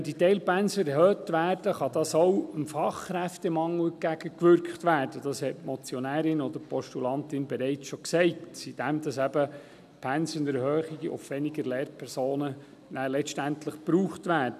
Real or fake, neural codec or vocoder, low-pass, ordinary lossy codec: real; none; 14.4 kHz; none